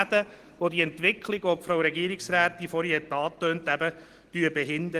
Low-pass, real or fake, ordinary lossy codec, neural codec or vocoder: 14.4 kHz; real; Opus, 16 kbps; none